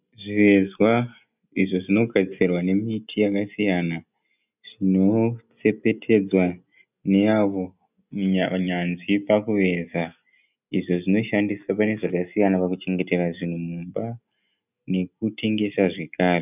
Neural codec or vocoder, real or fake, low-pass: none; real; 3.6 kHz